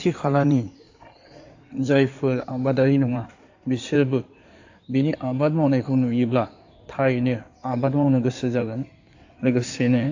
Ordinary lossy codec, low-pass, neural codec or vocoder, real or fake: AAC, 48 kbps; 7.2 kHz; codec, 16 kHz in and 24 kHz out, 2.2 kbps, FireRedTTS-2 codec; fake